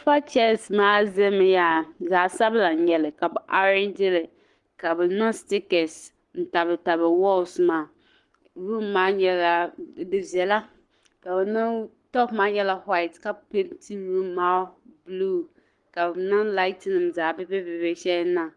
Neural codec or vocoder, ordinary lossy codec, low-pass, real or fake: codec, 44.1 kHz, 7.8 kbps, Pupu-Codec; Opus, 24 kbps; 10.8 kHz; fake